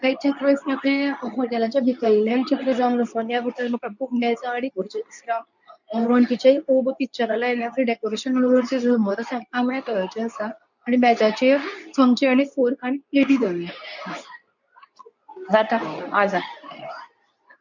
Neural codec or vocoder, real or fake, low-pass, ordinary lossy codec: codec, 24 kHz, 0.9 kbps, WavTokenizer, medium speech release version 2; fake; 7.2 kHz; none